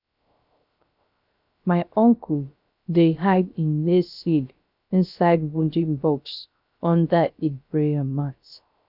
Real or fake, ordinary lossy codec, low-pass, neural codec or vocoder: fake; none; 5.4 kHz; codec, 16 kHz, 0.3 kbps, FocalCodec